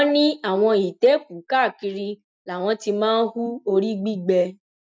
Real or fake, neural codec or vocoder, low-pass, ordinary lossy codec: real; none; none; none